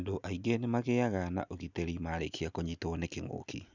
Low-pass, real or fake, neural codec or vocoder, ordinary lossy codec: 7.2 kHz; real; none; none